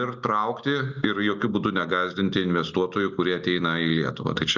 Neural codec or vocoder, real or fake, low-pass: none; real; 7.2 kHz